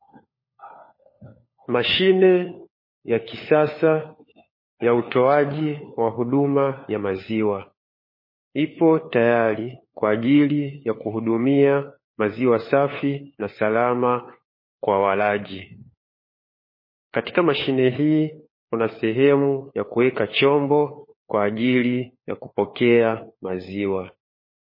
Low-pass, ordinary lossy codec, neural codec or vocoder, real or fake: 5.4 kHz; MP3, 24 kbps; codec, 16 kHz, 4 kbps, FunCodec, trained on LibriTTS, 50 frames a second; fake